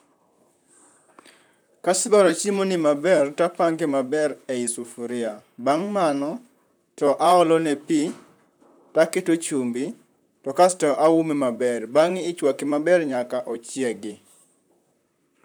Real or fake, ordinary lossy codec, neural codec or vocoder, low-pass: fake; none; vocoder, 44.1 kHz, 128 mel bands, Pupu-Vocoder; none